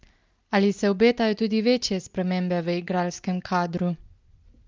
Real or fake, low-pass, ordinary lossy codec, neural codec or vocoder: real; 7.2 kHz; Opus, 32 kbps; none